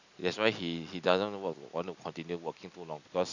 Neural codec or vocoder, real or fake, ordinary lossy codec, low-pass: none; real; none; 7.2 kHz